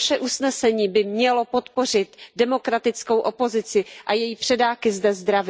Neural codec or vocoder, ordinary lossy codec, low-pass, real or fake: none; none; none; real